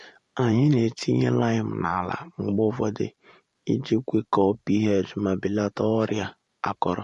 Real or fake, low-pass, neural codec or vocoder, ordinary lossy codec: fake; 14.4 kHz; vocoder, 44.1 kHz, 128 mel bands every 512 samples, BigVGAN v2; MP3, 48 kbps